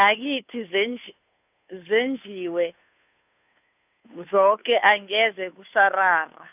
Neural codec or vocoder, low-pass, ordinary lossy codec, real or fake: codec, 16 kHz, 2 kbps, FunCodec, trained on Chinese and English, 25 frames a second; 3.6 kHz; none; fake